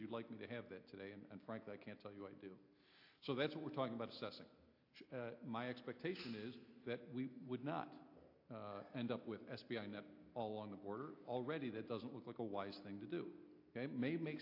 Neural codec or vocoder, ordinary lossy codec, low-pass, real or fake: none; AAC, 48 kbps; 5.4 kHz; real